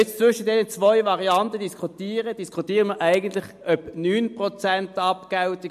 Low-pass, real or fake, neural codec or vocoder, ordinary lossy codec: 14.4 kHz; real; none; MP3, 64 kbps